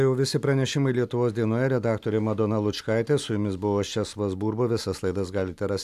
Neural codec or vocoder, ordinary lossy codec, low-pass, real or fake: none; AAC, 96 kbps; 14.4 kHz; real